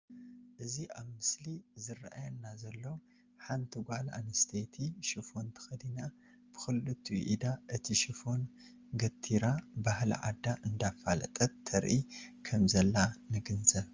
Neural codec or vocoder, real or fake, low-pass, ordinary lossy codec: none; real; 7.2 kHz; Opus, 32 kbps